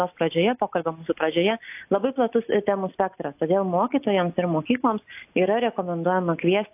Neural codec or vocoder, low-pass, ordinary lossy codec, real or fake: none; 3.6 kHz; AAC, 32 kbps; real